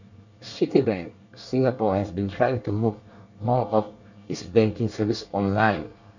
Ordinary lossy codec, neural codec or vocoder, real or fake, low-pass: none; codec, 24 kHz, 1 kbps, SNAC; fake; 7.2 kHz